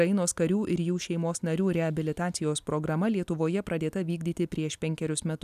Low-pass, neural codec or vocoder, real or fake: 14.4 kHz; none; real